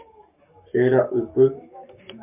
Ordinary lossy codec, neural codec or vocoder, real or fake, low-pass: MP3, 32 kbps; codec, 44.1 kHz, 7.8 kbps, Pupu-Codec; fake; 3.6 kHz